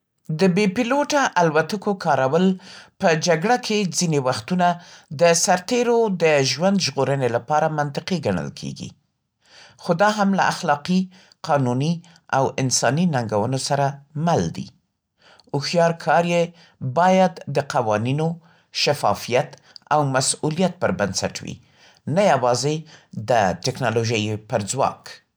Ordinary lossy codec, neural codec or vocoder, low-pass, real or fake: none; none; none; real